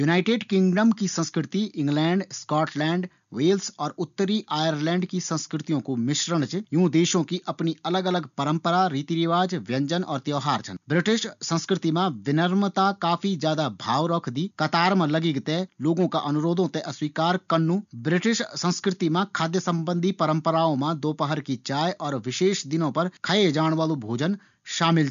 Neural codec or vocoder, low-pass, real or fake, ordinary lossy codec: none; 7.2 kHz; real; none